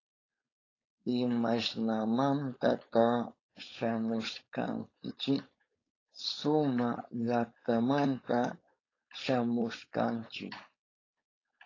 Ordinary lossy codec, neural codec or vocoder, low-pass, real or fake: AAC, 32 kbps; codec, 16 kHz, 4.8 kbps, FACodec; 7.2 kHz; fake